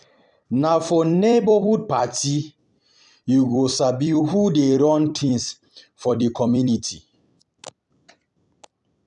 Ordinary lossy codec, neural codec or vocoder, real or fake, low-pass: none; vocoder, 44.1 kHz, 128 mel bands every 256 samples, BigVGAN v2; fake; 10.8 kHz